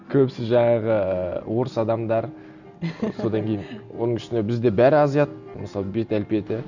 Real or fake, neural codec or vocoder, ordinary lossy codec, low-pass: real; none; none; 7.2 kHz